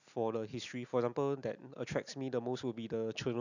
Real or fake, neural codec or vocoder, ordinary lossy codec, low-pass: real; none; none; 7.2 kHz